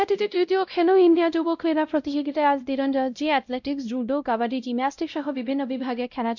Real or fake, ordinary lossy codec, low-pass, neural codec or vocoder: fake; none; 7.2 kHz; codec, 16 kHz, 0.5 kbps, X-Codec, WavLM features, trained on Multilingual LibriSpeech